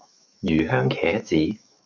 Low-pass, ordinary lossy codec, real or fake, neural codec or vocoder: 7.2 kHz; MP3, 64 kbps; fake; autoencoder, 48 kHz, 128 numbers a frame, DAC-VAE, trained on Japanese speech